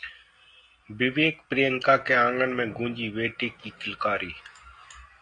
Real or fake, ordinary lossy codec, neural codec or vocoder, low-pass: real; AAC, 48 kbps; none; 9.9 kHz